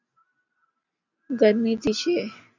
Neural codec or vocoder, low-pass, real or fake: none; 7.2 kHz; real